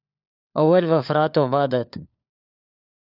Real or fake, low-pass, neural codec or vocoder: fake; 5.4 kHz; codec, 16 kHz, 4 kbps, FunCodec, trained on LibriTTS, 50 frames a second